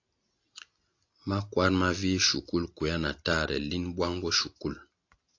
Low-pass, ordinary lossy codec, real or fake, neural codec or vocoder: 7.2 kHz; AAC, 48 kbps; real; none